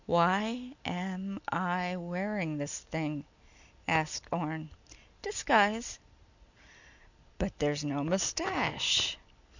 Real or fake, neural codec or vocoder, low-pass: real; none; 7.2 kHz